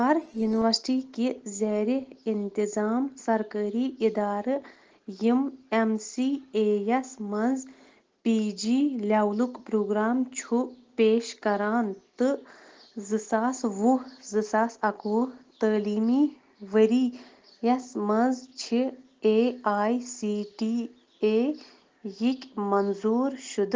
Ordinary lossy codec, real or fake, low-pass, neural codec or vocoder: Opus, 16 kbps; real; 7.2 kHz; none